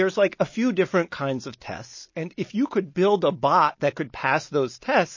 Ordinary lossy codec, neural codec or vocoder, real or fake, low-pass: MP3, 32 kbps; none; real; 7.2 kHz